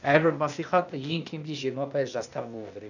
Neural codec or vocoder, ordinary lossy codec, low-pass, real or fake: codec, 16 kHz, 0.8 kbps, ZipCodec; none; 7.2 kHz; fake